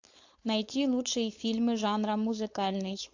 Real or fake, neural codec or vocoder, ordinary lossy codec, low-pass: fake; codec, 16 kHz, 4.8 kbps, FACodec; AAC, 48 kbps; 7.2 kHz